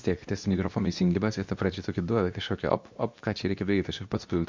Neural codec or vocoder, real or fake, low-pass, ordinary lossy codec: codec, 24 kHz, 0.9 kbps, WavTokenizer, medium speech release version 2; fake; 7.2 kHz; MP3, 64 kbps